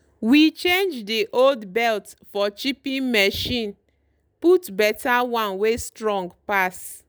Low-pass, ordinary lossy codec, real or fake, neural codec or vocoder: none; none; real; none